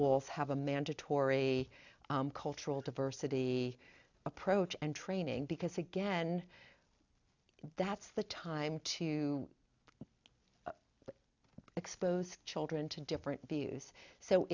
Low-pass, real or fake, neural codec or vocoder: 7.2 kHz; real; none